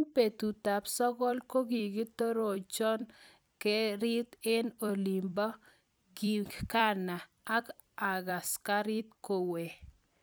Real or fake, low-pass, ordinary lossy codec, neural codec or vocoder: fake; none; none; vocoder, 44.1 kHz, 128 mel bands every 256 samples, BigVGAN v2